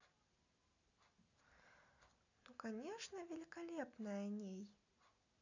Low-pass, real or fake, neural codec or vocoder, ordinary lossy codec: 7.2 kHz; real; none; none